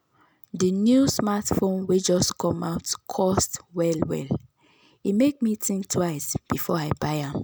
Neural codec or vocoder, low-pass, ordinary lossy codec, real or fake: none; none; none; real